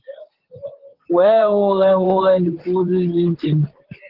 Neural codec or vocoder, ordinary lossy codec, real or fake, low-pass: vocoder, 44.1 kHz, 128 mel bands, Pupu-Vocoder; Opus, 16 kbps; fake; 5.4 kHz